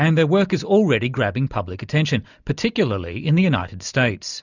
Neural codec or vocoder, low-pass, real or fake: none; 7.2 kHz; real